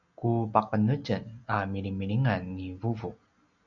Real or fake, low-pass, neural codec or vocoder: real; 7.2 kHz; none